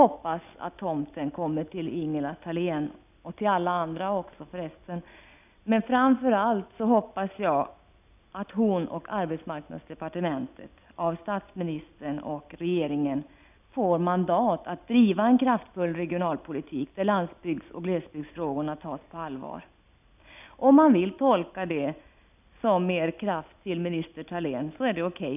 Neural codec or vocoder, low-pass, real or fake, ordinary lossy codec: none; 3.6 kHz; real; none